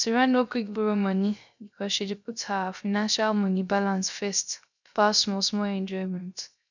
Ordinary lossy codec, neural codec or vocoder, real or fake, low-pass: none; codec, 16 kHz, 0.3 kbps, FocalCodec; fake; 7.2 kHz